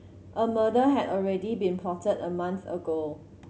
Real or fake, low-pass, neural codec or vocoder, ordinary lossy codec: real; none; none; none